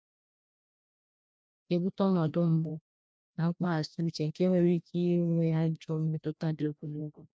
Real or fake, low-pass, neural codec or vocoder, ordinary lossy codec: fake; none; codec, 16 kHz, 1 kbps, FreqCodec, larger model; none